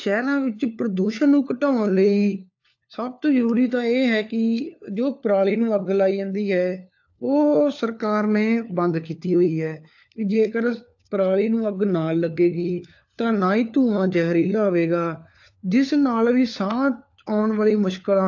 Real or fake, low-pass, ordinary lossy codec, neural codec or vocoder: fake; 7.2 kHz; AAC, 48 kbps; codec, 16 kHz, 4 kbps, FunCodec, trained on LibriTTS, 50 frames a second